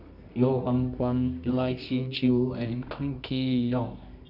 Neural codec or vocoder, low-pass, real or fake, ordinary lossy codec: codec, 24 kHz, 0.9 kbps, WavTokenizer, medium music audio release; 5.4 kHz; fake; none